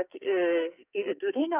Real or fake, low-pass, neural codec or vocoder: fake; 3.6 kHz; codec, 44.1 kHz, 2.6 kbps, SNAC